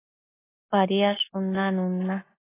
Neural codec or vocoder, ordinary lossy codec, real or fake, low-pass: none; AAC, 16 kbps; real; 3.6 kHz